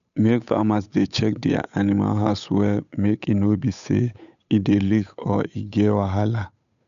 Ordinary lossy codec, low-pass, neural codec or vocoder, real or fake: none; 7.2 kHz; codec, 16 kHz, 8 kbps, FunCodec, trained on Chinese and English, 25 frames a second; fake